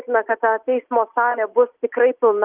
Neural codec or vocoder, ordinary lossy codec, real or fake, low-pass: none; Opus, 24 kbps; real; 3.6 kHz